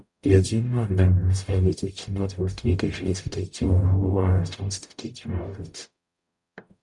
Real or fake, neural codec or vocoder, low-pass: fake; codec, 44.1 kHz, 0.9 kbps, DAC; 10.8 kHz